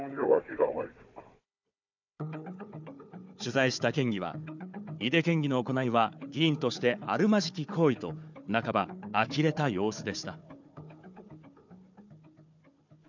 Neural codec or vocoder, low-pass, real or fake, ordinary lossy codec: codec, 16 kHz, 4 kbps, FunCodec, trained on Chinese and English, 50 frames a second; 7.2 kHz; fake; none